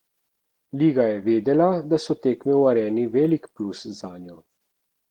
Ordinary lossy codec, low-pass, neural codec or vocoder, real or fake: Opus, 16 kbps; 19.8 kHz; none; real